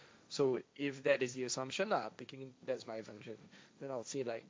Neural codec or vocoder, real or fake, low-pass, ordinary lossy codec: codec, 16 kHz, 1.1 kbps, Voila-Tokenizer; fake; none; none